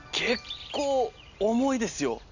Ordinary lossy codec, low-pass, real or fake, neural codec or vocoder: none; 7.2 kHz; real; none